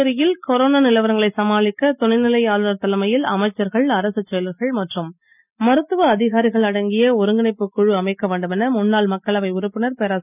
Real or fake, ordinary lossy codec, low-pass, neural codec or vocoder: real; none; 3.6 kHz; none